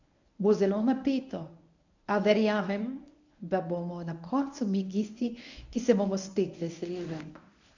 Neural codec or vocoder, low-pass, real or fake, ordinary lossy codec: codec, 24 kHz, 0.9 kbps, WavTokenizer, medium speech release version 1; 7.2 kHz; fake; none